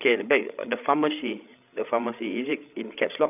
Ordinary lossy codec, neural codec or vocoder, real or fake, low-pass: none; codec, 16 kHz, 16 kbps, FreqCodec, larger model; fake; 3.6 kHz